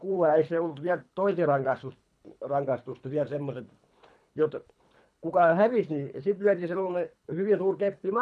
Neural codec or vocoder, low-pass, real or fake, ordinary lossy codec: codec, 24 kHz, 3 kbps, HILCodec; none; fake; none